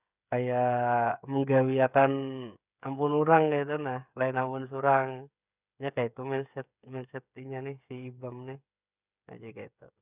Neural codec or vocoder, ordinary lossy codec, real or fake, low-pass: codec, 16 kHz, 8 kbps, FreqCodec, smaller model; none; fake; 3.6 kHz